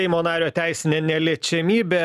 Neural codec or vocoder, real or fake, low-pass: none; real; 14.4 kHz